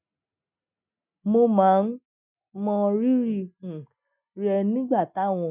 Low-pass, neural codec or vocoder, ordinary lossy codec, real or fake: 3.6 kHz; none; none; real